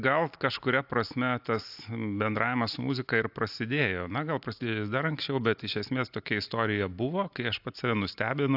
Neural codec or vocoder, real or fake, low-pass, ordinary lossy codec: none; real; 5.4 kHz; AAC, 48 kbps